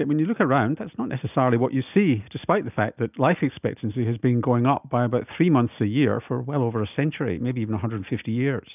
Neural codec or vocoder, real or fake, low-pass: none; real; 3.6 kHz